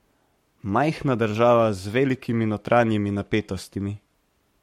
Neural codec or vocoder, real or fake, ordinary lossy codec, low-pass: codec, 44.1 kHz, 7.8 kbps, Pupu-Codec; fake; MP3, 64 kbps; 19.8 kHz